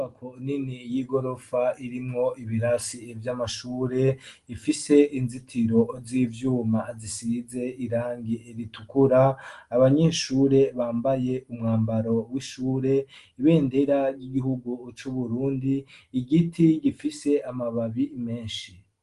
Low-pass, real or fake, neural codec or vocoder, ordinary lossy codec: 10.8 kHz; real; none; Opus, 24 kbps